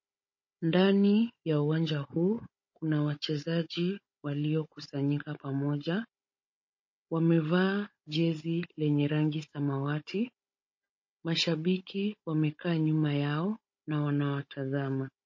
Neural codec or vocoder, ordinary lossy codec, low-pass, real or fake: codec, 16 kHz, 16 kbps, FunCodec, trained on Chinese and English, 50 frames a second; MP3, 32 kbps; 7.2 kHz; fake